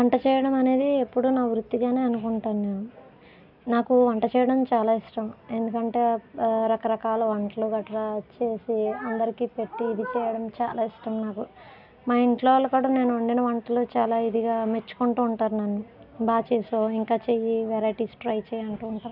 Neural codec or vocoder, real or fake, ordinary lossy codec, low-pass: none; real; none; 5.4 kHz